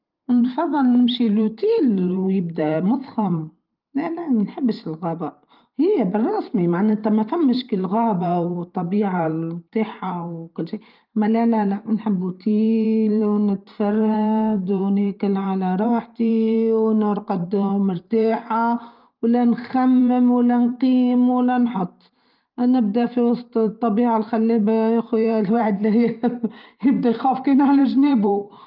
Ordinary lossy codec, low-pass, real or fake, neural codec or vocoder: Opus, 32 kbps; 5.4 kHz; fake; vocoder, 44.1 kHz, 128 mel bands every 512 samples, BigVGAN v2